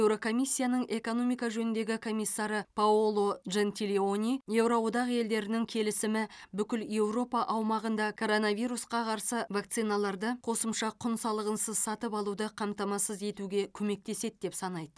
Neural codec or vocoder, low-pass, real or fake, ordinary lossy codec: none; none; real; none